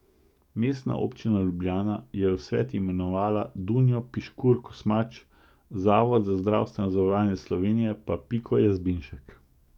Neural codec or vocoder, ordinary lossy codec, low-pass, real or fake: codec, 44.1 kHz, 7.8 kbps, DAC; none; 19.8 kHz; fake